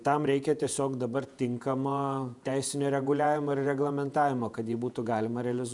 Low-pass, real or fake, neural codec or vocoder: 10.8 kHz; fake; vocoder, 48 kHz, 128 mel bands, Vocos